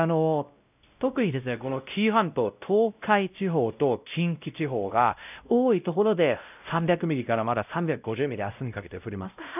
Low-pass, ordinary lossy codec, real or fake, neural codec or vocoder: 3.6 kHz; none; fake; codec, 16 kHz, 0.5 kbps, X-Codec, WavLM features, trained on Multilingual LibriSpeech